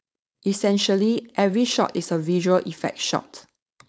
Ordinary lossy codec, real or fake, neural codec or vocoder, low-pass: none; fake; codec, 16 kHz, 4.8 kbps, FACodec; none